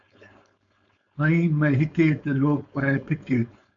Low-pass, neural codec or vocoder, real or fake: 7.2 kHz; codec, 16 kHz, 4.8 kbps, FACodec; fake